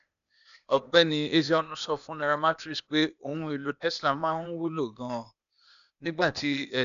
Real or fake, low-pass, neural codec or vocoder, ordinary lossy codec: fake; 7.2 kHz; codec, 16 kHz, 0.8 kbps, ZipCodec; none